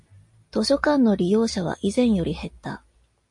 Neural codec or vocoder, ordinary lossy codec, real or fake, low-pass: none; MP3, 48 kbps; real; 10.8 kHz